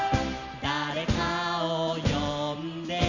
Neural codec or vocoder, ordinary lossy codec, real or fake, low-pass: none; none; real; 7.2 kHz